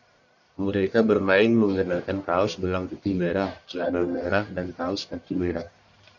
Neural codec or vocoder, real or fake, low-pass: codec, 44.1 kHz, 1.7 kbps, Pupu-Codec; fake; 7.2 kHz